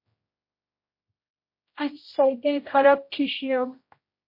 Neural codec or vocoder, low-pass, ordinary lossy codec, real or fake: codec, 16 kHz, 0.5 kbps, X-Codec, HuBERT features, trained on general audio; 5.4 kHz; MP3, 32 kbps; fake